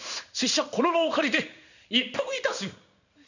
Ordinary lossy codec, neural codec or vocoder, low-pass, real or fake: none; codec, 16 kHz in and 24 kHz out, 1 kbps, XY-Tokenizer; 7.2 kHz; fake